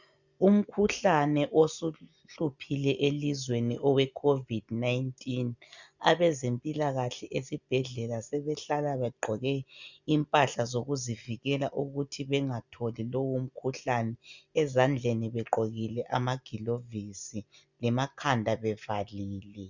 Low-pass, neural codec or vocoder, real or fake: 7.2 kHz; none; real